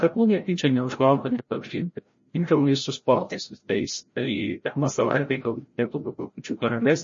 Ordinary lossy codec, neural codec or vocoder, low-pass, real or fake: MP3, 32 kbps; codec, 16 kHz, 0.5 kbps, FreqCodec, larger model; 7.2 kHz; fake